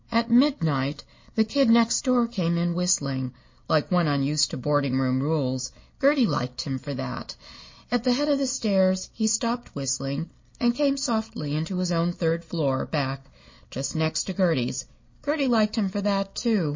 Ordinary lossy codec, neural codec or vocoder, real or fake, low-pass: MP3, 32 kbps; none; real; 7.2 kHz